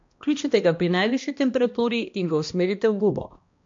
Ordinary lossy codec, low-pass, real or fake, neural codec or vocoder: MP3, 48 kbps; 7.2 kHz; fake; codec, 16 kHz, 1 kbps, X-Codec, HuBERT features, trained on balanced general audio